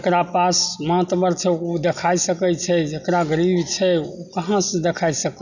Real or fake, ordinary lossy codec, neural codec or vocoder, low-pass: real; none; none; 7.2 kHz